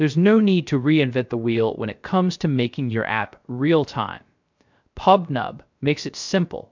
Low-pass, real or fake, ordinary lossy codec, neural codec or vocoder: 7.2 kHz; fake; MP3, 64 kbps; codec, 16 kHz, 0.3 kbps, FocalCodec